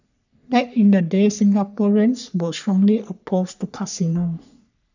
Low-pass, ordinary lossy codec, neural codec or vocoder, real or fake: 7.2 kHz; none; codec, 44.1 kHz, 3.4 kbps, Pupu-Codec; fake